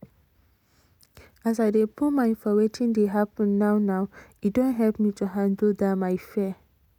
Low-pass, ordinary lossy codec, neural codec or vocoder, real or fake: 19.8 kHz; none; none; real